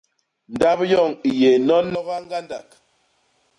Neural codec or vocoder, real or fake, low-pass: none; real; 10.8 kHz